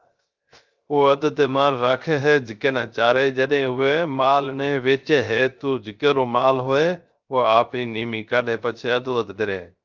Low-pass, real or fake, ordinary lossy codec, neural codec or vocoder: 7.2 kHz; fake; Opus, 24 kbps; codec, 16 kHz, 0.3 kbps, FocalCodec